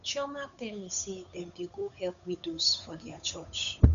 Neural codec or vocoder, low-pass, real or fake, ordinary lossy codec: codec, 16 kHz, 8 kbps, FunCodec, trained on Chinese and English, 25 frames a second; 7.2 kHz; fake; none